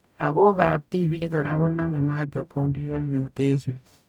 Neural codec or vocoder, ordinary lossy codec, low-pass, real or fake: codec, 44.1 kHz, 0.9 kbps, DAC; none; 19.8 kHz; fake